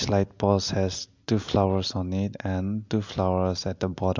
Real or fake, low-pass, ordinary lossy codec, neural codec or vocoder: real; 7.2 kHz; MP3, 64 kbps; none